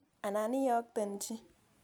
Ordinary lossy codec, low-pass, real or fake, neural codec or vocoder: none; none; real; none